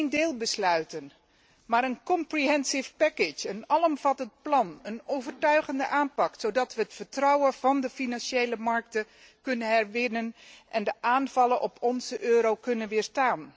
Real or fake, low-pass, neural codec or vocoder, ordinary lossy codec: real; none; none; none